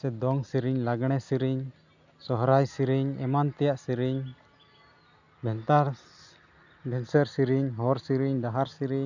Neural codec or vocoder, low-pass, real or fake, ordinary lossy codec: none; 7.2 kHz; real; none